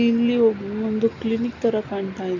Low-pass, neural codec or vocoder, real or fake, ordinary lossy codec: 7.2 kHz; none; real; none